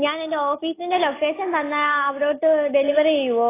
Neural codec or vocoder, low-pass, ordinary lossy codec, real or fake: none; 3.6 kHz; AAC, 16 kbps; real